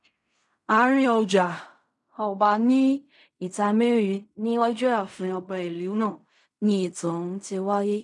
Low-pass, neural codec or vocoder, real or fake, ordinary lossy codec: 10.8 kHz; codec, 16 kHz in and 24 kHz out, 0.4 kbps, LongCat-Audio-Codec, fine tuned four codebook decoder; fake; none